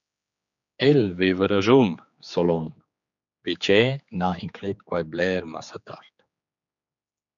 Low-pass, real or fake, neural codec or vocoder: 7.2 kHz; fake; codec, 16 kHz, 4 kbps, X-Codec, HuBERT features, trained on general audio